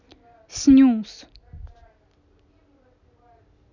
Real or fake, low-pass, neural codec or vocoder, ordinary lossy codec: real; 7.2 kHz; none; none